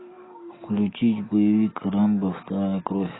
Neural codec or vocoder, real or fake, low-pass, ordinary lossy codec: none; real; 7.2 kHz; AAC, 16 kbps